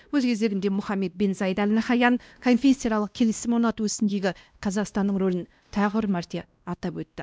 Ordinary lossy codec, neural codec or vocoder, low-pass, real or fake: none; codec, 16 kHz, 1 kbps, X-Codec, WavLM features, trained on Multilingual LibriSpeech; none; fake